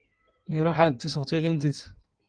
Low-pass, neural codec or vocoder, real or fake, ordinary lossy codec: 9.9 kHz; codec, 16 kHz in and 24 kHz out, 1.1 kbps, FireRedTTS-2 codec; fake; Opus, 24 kbps